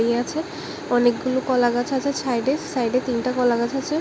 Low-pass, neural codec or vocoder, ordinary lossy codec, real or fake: none; none; none; real